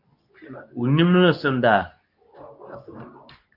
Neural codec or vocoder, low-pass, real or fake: codec, 24 kHz, 0.9 kbps, WavTokenizer, medium speech release version 2; 5.4 kHz; fake